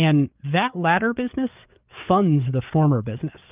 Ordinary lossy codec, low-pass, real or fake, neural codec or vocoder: Opus, 32 kbps; 3.6 kHz; fake; codec, 16 kHz, 4 kbps, FreqCodec, larger model